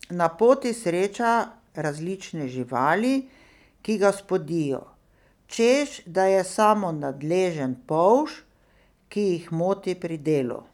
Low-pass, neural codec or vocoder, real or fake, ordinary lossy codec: 19.8 kHz; none; real; none